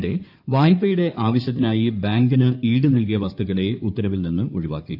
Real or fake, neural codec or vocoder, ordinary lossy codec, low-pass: fake; codec, 16 kHz in and 24 kHz out, 2.2 kbps, FireRedTTS-2 codec; none; 5.4 kHz